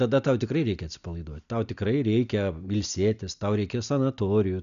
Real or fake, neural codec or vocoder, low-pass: real; none; 7.2 kHz